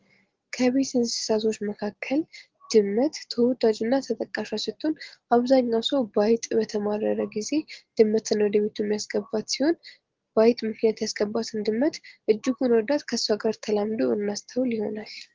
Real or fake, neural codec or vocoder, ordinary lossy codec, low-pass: real; none; Opus, 16 kbps; 7.2 kHz